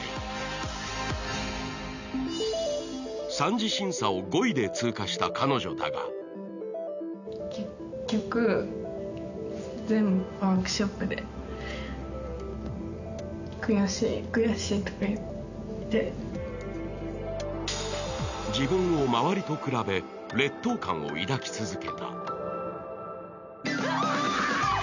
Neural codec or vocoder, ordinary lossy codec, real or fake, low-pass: none; none; real; 7.2 kHz